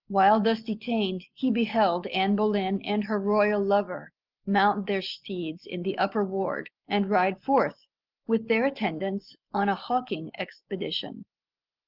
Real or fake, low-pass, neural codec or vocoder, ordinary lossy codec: real; 5.4 kHz; none; Opus, 32 kbps